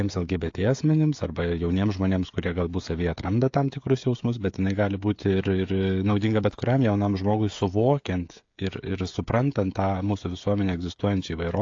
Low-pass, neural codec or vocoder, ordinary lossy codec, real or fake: 7.2 kHz; codec, 16 kHz, 16 kbps, FreqCodec, smaller model; AAC, 48 kbps; fake